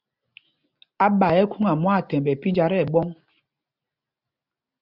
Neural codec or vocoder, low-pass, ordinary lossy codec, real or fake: none; 5.4 kHz; Opus, 64 kbps; real